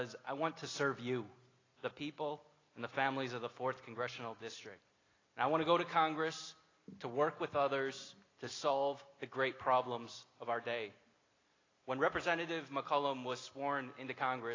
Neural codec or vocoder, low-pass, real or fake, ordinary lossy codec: none; 7.2 kHz; real; AAC, 32 kbps